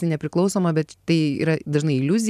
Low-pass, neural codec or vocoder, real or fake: 14.4 kHz; vocoder, 44.1 kHz, 128 mel bands every 512 samples, BigVGAN v2; fake